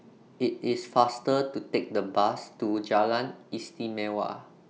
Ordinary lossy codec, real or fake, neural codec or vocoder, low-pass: none; real; none; none